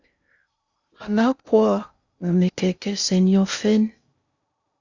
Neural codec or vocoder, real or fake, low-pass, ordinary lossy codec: codec, 16 kHz in and 24 kHz out, 0.6 kbps, FocalCodec, streaming, 2048 codes; fake; 7.2 kHz; Opus, 64 kbps